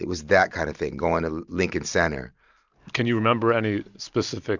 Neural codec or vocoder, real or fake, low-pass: none; real; 7.2 kHz